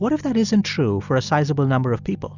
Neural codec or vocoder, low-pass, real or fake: none; 7.2 kHz; real